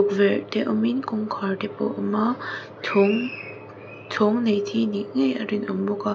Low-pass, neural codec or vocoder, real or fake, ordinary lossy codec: none; none; real; none